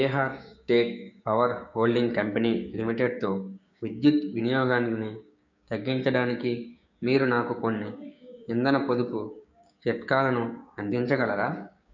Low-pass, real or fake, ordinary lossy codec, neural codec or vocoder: 7.2 kHz; fake; none; codec, 16 kHz, 6 kbps, DAC